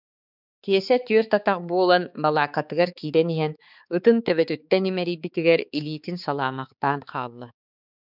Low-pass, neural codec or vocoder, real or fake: 5.4 kHz; codec, 16 kHz, 4 kbps, X-Codec, HuBERT features, trained on balanced general audio; fake